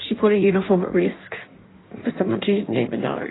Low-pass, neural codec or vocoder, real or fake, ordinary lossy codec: 7.2 kHz; codec, 16 kHz in and 24 kHz out, 1.1 kbps, FireRedTTS-2 codec; fake; AAC, 16 kbps